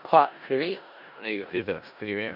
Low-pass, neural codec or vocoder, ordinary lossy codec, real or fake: 5.4 kHz; codec, 16 kHz in and 24 kHz out, 0.4 kbps, LongCat-Audio-Codec, four codebook decoder; none; fake